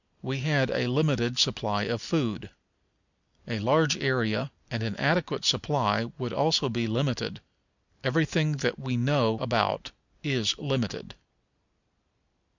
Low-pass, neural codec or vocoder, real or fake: 7.2 kHz; none; real